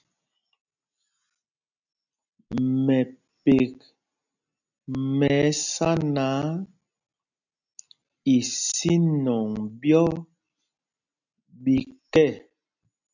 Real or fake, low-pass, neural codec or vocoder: real; 7.2 kHz; none